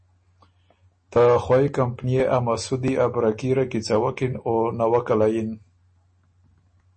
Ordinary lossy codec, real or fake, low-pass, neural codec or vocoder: MP3, 32 kbps; real; 10.8 kHz; none